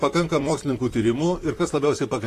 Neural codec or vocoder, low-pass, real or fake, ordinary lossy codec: vocoder, 44.1 kHz, 128 mel bands, Pupu-Vocoder; 14.4 kHz; fake; AAC, 48 kbps